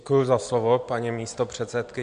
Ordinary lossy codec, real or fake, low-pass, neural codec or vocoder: MP3, 64 kbps; real; 9.9 kHz; none